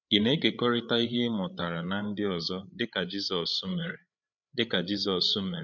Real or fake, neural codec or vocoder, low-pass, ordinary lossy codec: fake; codec, 16 kHz, 8 kbps, FreqCodec, larger model; 7.2 kHz; none